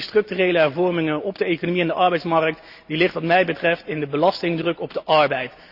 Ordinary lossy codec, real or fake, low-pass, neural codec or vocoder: Opus, 64 kbps; real; 5.4 kHz; none